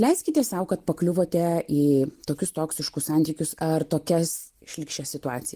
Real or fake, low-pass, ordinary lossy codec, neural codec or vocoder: real; 14.4 kHz; Opus, 24 kbps; none